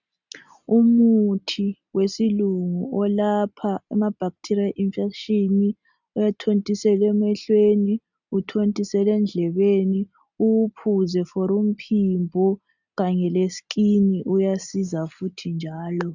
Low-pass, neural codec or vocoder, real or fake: 7.2 kHz; none; real